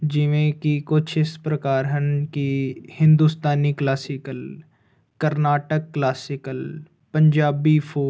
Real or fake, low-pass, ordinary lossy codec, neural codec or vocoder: real; none; none; none